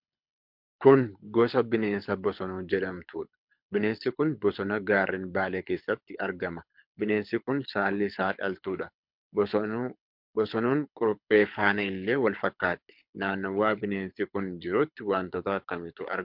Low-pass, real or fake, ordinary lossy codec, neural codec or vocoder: 5.4 kHz; fake; MP3, 48 kbps; codec, 24 kHz, 6 kbps, HILCodec